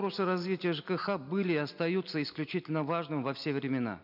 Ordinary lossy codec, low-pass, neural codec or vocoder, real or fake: none; 5.4 kHz; none; real